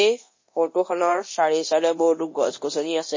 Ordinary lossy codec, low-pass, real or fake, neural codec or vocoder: MP3, 32 kbps; 7.2 kHz; fake; codec, 24 kHz, 0.9 kbps, DualCodec